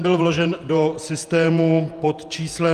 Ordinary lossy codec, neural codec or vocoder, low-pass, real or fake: Opus, 32 kbps; vocoder, 48 kHz, 128 mel bands, Vocos; 14.4 kHz; fake